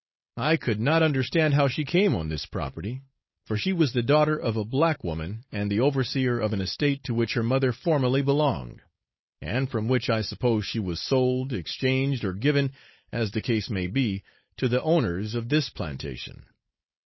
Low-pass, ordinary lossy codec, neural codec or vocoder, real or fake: 7.2 kHz; MP3, 24 kbps; codec, 16 kHz, 4.8 kbps, FACodec; fake